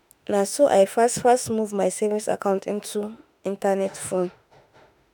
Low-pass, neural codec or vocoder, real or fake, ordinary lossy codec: none; autoencoder, 48 kHz, 32 numbers a frame, DAC-VAE, trained on Japanese speech; fake; none